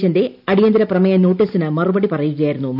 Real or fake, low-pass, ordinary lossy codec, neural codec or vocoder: real; 5.4 kHz; none; none